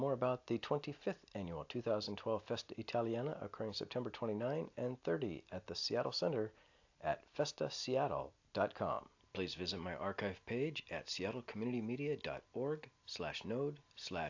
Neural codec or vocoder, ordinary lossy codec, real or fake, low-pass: none; AAC, 48 kbps; real; 7.2 kHz